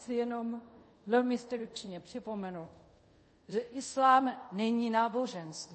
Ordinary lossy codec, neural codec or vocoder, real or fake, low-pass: MP3, 32 kbps; codec, 24 kHz, 0.5 kbps, DualCodec; fake; 10.8 kHz